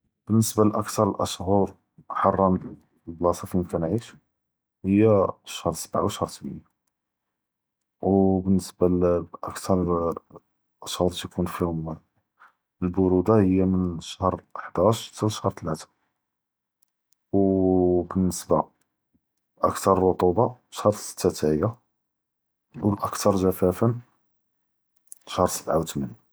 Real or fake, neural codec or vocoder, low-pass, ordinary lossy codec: real; none; none; none